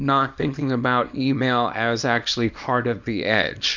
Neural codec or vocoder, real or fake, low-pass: codec, 24 kHz, 0.9 kbps, WavTokenizer, small release; fake; 7.2 kHz